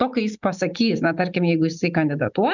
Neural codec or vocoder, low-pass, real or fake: none; 7.2 kHz; real